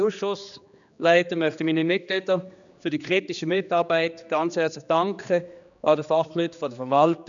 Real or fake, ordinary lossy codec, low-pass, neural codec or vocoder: fake; none; 7.2 kHz; codec, 16 kHz, 2 kbps, X-Codec, HuBERT features, trained on general audio